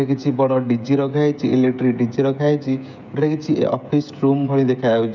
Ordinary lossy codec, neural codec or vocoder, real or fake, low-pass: none; codec, 16 kHz, 16 kbps, FreqCodec, smaller model; fake; 7.2 kHz